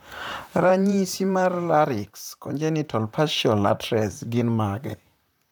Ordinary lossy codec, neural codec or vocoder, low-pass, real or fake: none; vocoder, 44.1 kHz, 128 mel bands, Pupu-Vocoder; none; fake